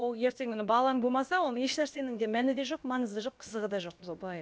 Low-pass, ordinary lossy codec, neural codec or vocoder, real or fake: none; none; codec, 16 kHz, about 1 kbps, DyCAST, with the encoder's durations; fake